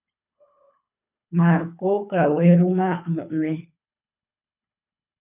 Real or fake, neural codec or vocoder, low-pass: fake; codec, 24 kHz, 3 kbps, HILCodec; 3.6 kHz